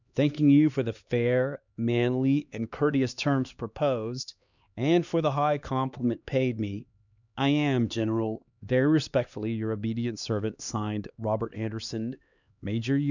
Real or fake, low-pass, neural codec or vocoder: fake; 7.2 kHz; codec, 16 kHz, 2 kbps, X-Codec, HuBERT features, trained on LibriSpeech